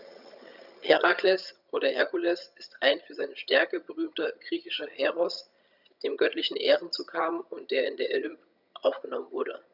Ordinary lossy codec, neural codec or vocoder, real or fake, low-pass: none; vocoder, 22.05 kHz, 80 mel bands, HiFi-GAN; fake; 5.4 kHz